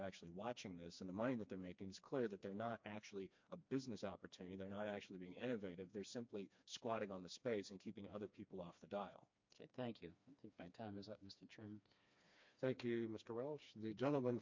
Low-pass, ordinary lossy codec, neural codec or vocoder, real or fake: 7.2 kHz; MP3, 48 kbps; codec, 16 kHz, 2 kbps, FreqCodec, smaller model; fake